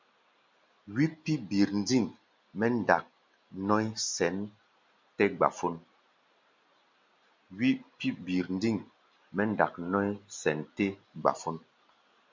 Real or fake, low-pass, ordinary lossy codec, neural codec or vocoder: real; 7.2 kHz; MP3, 64 kbps; none